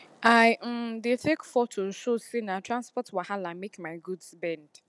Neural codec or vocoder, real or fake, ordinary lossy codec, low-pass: none; real; none; none